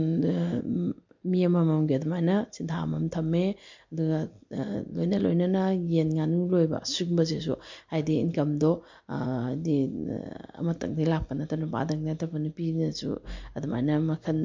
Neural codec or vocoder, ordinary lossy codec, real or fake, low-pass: none; MP3, 48 kbps; real; 7.2 kHz